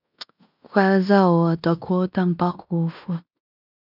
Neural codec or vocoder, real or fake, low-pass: codec, 16 kHz in and 24 kHz out, 0.9 kbps, LongCat-Audio-Codec, fine tuned four codebook decoder; fake; 5.4 kHz